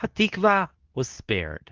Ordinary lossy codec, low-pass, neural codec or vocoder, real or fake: Opus, 32 kbps; 7.2 kHz; codec, 24 kHz, 0.9 kbps, WavTokenizer, medium speech release version 2; fake